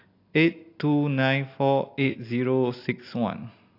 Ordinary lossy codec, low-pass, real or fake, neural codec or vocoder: AAC, 32 kbps; 5.4 kHz; real; none